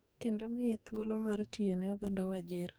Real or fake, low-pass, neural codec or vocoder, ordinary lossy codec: fake; none; codec, 44.1 kHz, 2.6 kbps, DAC; none